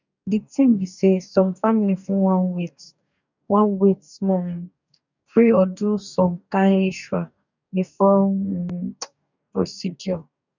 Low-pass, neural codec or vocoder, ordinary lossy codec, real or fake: 7.2 kHz; codec, 44.1 kHz, 2.6 kbps, DAC; none; fake